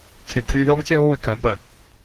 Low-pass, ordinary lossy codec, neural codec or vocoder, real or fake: 14.4 kHz; Opus, 16 kbps; codec, 32 kHz, 1.9 kbps, SNAC; fake